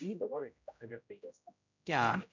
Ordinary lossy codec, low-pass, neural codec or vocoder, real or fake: none; 7.2 kHz; codec, 16 kHz, 0.5 kbps, X-Codec, HuBERT features, trained on general audio; fake